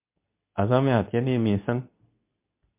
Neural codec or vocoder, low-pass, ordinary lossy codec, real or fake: codec, 24 kHz, 0.9 kbps, WavTokenizer, medium speech release version 2; 3.6 kHz; MP3, 32 kbps; fake